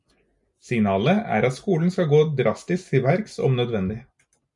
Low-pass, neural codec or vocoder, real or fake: 10.8 kHz; none; real